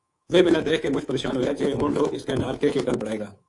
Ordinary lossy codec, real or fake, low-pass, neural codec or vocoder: AAC, 48 kbps; fake; 10.8 kHz; codec, 24 kHz, 3.1 kbps, DualCodec